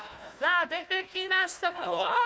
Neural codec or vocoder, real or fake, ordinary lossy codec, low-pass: codec, 16 kHz, 1 kbps, FunCodec, trained on Chinese and English, 50 frames a second; fake; none; none